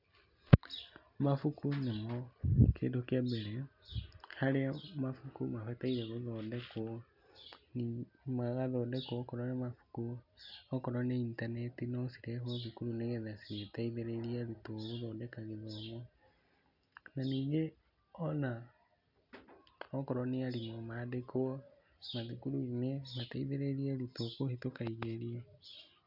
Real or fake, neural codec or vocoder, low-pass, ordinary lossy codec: real; none; 5.4 kHz; none